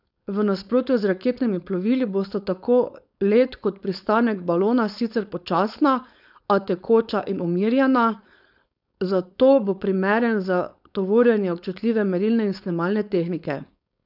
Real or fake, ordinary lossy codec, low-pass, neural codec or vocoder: fake; none; 5.4 kHz; codec, 16 kHz, 4.8 kbps, FACodec